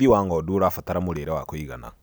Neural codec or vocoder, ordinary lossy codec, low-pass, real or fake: none; none; none; real